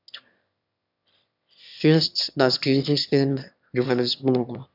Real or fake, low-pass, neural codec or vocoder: fake; 5.4 kHz; autoencoder, 22.05 kHz, a latent of 192 numbers a frame, VITS, trained on one speaker